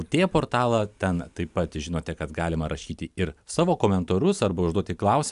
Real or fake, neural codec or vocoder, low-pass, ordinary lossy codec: real; none; 10.8 kHz; AAC, 96 kbps